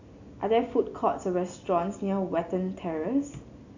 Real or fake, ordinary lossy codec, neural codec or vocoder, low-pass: real; AAC, 48 kbps; none; 7.2 kHz